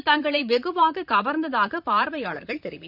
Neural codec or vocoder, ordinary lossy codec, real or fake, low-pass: vocoder, 44.1 kHz, 128 mel bands, Pupu-Vocoder; none; fake; 5.4 kHz